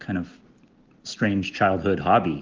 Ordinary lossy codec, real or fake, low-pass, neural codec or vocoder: Opus, 16 kbps; real; 7.2 kHz; none